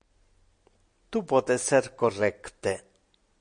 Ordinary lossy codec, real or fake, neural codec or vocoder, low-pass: MP3, 48 kbps; real; none; 9.9 kHz